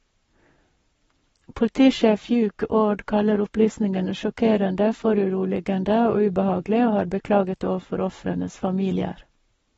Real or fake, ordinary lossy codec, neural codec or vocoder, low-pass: fake; AAC, 24 kbps; codec, 44.1 kHz, 7.8 kbps, Pupu-Codec; 19.8 kHz